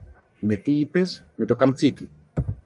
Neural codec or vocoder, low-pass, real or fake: codec, 44.1 kHz, 1.7 kbps, Pupu-Codec; 10.8 kHz; fake